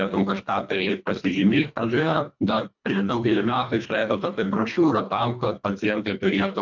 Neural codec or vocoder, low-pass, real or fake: codec, 24 kHz, 1.5 kbps, HILCodec; 7.2 kHz; fake